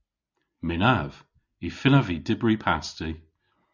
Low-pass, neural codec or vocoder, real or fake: 7.2 kHz; none; real